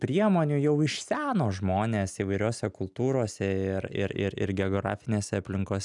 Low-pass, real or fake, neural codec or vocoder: 10.8 kHz; real; none